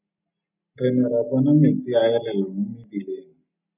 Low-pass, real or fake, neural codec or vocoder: 3.6 kHz; real; none